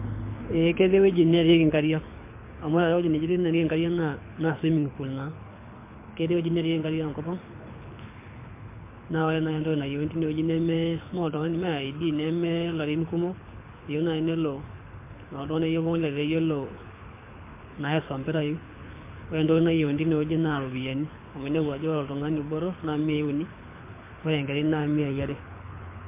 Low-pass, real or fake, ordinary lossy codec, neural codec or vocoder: 3.6 kHz; fake; MP3, 24 kbps; codec, 24 kHz, 6 kbps, HILCodec